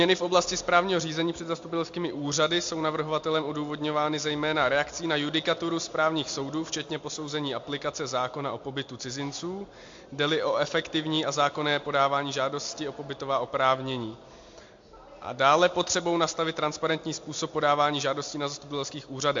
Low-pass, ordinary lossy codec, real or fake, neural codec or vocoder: 7.2 kHz; MP3, 48 kbps; real; none